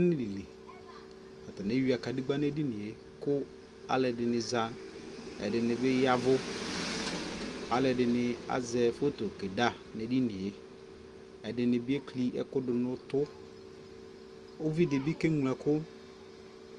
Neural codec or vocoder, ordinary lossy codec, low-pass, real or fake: none; Opus, 32 kbps; 10.8 kHz; real